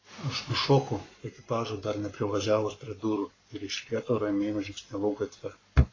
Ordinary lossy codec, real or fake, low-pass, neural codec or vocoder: AAC, 32 kbps; fake; 7.2 kHz; codec, 44.1 kHz, 7.8 kbps, Pupu-Codec